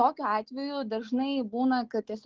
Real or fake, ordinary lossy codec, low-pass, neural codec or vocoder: real; Opus, 16 kbps; 7.2 kHz; none